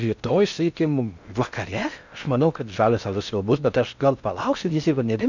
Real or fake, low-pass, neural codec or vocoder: fake; 7.2 kHz; codec, 16 kHz in and 24 kHz out, 0.6 kbps, FocalCodec, streaming, 2048 codes